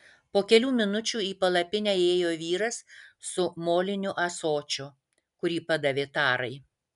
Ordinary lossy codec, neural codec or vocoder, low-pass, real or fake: MP3, 96 kbps; none; 10.8 kHz; real